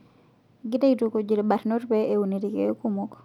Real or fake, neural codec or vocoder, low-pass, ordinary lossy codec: real; none; 19.8 kHz; none